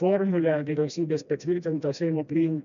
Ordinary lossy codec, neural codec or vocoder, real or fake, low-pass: MP3, 96 kbps; codec, 16 kHz, 1 kbps, FreqCodec, smaller model; fake; 7.2 kHz